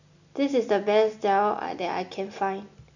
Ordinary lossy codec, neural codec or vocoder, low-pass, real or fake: none; none; 7.2 kHz; real